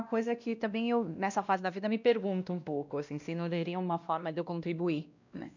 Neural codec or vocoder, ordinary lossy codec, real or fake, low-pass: codec, 16 kHz, 1 kbps, X-Codec, WavLM features, trained on Multilingual LibriSpeech; none; fake; 7.2 kHz